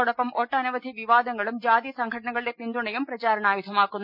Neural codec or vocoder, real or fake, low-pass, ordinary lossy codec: none; real; 5.4 kHz; none